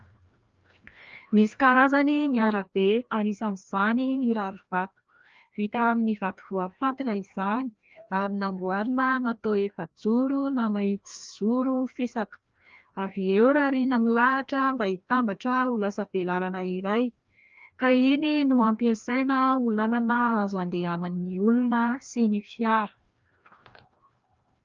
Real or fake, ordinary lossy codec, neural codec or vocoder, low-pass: fake; Opus, 24 kbps; codec, 16 kHz, 1 kbps, FreqCodec, larger model; 7.2 kHz